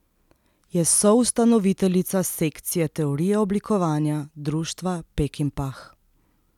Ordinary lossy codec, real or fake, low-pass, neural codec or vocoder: none; real; 19.8 kHz; none